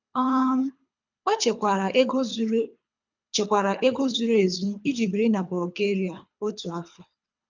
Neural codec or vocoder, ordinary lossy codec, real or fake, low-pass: codec, 24 kHz, 3 kbps, HILCodec; MP3, 64 kbps; fake; 7.2 kHz